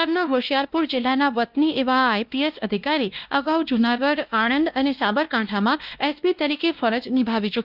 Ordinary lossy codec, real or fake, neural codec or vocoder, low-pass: Opus, 32 kbps; fake; codec, 16 kHz, 1 kbps, X-Codec, WavLM features, trained on Multilingual LibriSpeech; 5.4 kHz